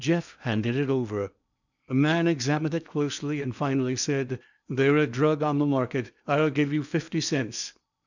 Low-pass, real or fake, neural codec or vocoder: 7.2 kHz; fake; codec, 16 kHz in and 24 kHz out, 0.8 kbps, FocalCodec, streaming, 65536 codes